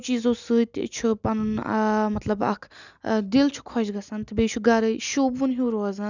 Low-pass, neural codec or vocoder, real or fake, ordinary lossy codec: 7.2 kHz; none; real; none